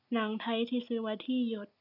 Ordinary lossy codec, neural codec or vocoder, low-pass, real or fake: none; none; 5.4 kHz; real